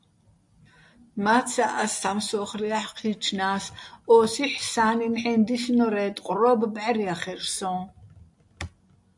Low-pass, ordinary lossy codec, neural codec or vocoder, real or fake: 10.8 kHz; AAC, 64 kbps; none; real